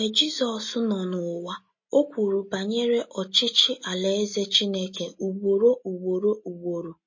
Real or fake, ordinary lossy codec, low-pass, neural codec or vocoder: real; MP3, 32 kbps; 7.2 kHz; none